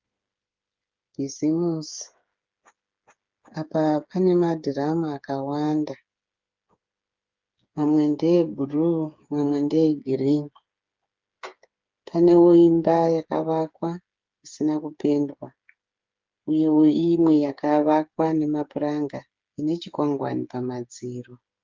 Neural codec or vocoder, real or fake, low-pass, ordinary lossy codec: codec, 16 kHz, 8 kbps, FreqCodec, smaller model; fake; 7.2 kHz; Opus, 32 kbps